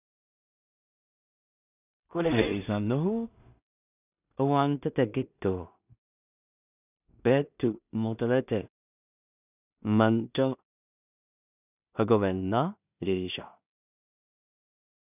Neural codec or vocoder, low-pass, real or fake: codec, 16 kHz in and 24 kHz out, 0.4 kbps, LongCat-Audio-Codec, two codebook decoder; 3.6 kHz; fake